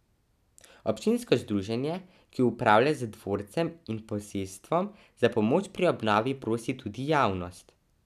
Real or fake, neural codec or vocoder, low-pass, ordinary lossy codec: real; none; 14.4 kHz; none